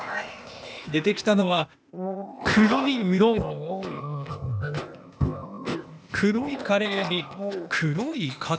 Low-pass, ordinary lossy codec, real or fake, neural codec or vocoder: none; none; fake; codec, 16 kHz, 0.8 kbps, ZipCodec